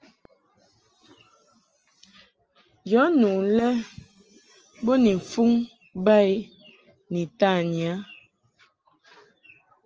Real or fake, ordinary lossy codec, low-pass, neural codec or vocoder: real; Opus, 24 kbps; 7.2 kHz; none